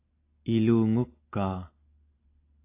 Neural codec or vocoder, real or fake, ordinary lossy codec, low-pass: none; real; AAC, 24 kbps; 3.6 kHz